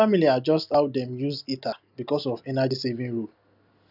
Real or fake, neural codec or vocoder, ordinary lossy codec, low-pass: real; none; none; 5.4 kHz